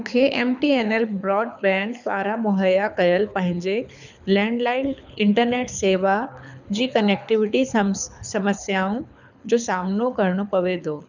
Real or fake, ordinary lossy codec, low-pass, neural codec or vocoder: fake; none; 7.2 kHz; codec, 24 kHz, 6 kbps, HILCodec